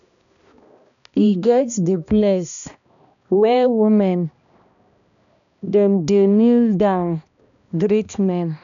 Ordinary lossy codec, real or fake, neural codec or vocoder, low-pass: none; fake; codec, 16 kHz, 1 kbps, X-Codec, HuBERT features, trained on balanced general audio; 7.2 kHz